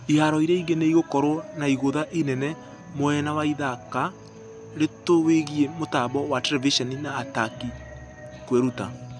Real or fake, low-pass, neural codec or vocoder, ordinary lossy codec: real; 9.9 kHz; none; none